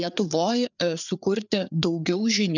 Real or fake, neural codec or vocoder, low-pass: fake; codec, 16 kHz, 4 kbps, FreqCodec, larger model; 7.2 kHz